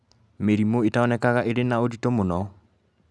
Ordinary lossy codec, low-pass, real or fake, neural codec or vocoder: none; none; real; none